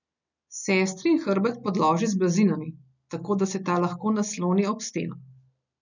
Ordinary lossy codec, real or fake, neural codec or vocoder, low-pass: none; real; none; 7.2 kHz